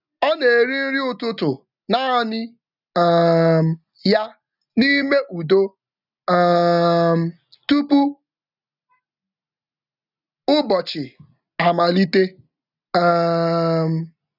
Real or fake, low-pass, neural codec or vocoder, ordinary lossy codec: real; 5.4 kHz; none; none